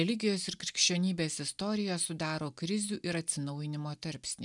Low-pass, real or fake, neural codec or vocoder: 10.8 kHz; real; none